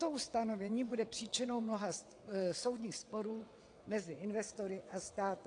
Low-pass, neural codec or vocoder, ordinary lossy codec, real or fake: 9.9 kHz; vocoder, 22.05 kHz, 80 mel bands, WaveNeXt; AAC, 48 kbps; fake